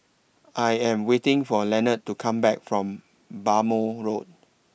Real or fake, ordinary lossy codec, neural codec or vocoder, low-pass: real; none; none; none